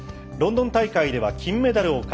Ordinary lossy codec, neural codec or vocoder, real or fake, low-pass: none; none; real; none